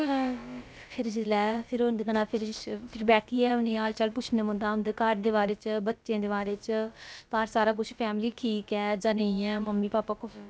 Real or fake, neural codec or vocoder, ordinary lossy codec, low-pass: fake; codec, 16 kHz, about 1 kbps, DyCAST, with the encoder's durations; none; none